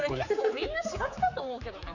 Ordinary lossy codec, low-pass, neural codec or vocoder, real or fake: none; 7.2 kHz; codec, 16 kHz, 4 kbps, X-Codec, HuBERT features, trained on general audio; fake